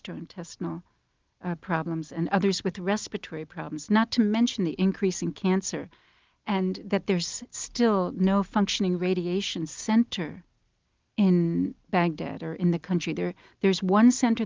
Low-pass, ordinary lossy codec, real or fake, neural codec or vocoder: 7.2 kHz; Opus, 24 kbps; real; none